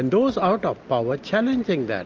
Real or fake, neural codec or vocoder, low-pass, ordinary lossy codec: real; none; 7.2 kHz; Opus, 24 kbps